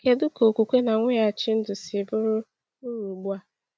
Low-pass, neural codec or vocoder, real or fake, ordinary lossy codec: none; none; real; none